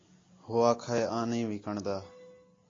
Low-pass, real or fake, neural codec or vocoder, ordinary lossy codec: 7.2 kHz; real; none; AAC, 32 kbps